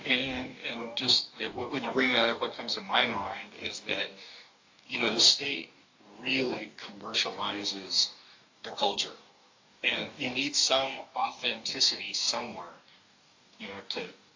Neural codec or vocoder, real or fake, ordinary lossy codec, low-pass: codec, 44.1 kHz, 2.6 kbps, DAC; fake; AAC, 48 kbps; 7.2 kHz